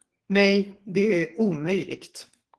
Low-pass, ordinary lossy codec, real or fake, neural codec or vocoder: 10.8 kHz; Opus, 16 kbps; fake; codec, 32 kHz, 1.9 kbps, SNAC